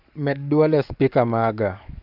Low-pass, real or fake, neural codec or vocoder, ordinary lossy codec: 5.4 kHz; fake; codec, 44.1 kHz, 7.8 kbps, DAC; none